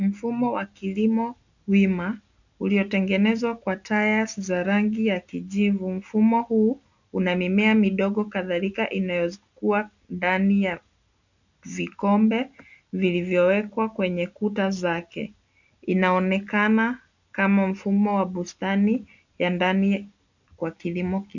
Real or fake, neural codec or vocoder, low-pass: real; none; 7.2 kHz